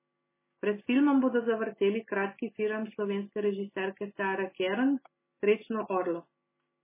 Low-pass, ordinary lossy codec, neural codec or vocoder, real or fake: 3.6 kHz; MP3, 16 kbps; none; real